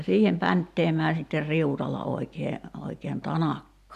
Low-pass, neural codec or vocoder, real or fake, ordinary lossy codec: 14.4 kHz; none; real; none